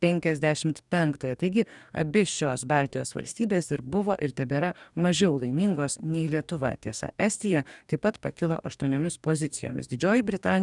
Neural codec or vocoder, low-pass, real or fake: codec, 44.1 kHz, 2.6 kbps, DAC; 10.8 kHz; fake